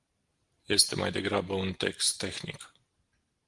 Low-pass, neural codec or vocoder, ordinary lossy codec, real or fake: 10.8 kHz; none; Opus, 24 kbps; real